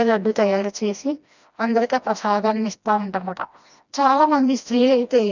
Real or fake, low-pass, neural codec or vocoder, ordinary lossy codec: fake; 7.2 kHz; codec, 16 kHz, 1 kbps, FreqCodec, smaller model; none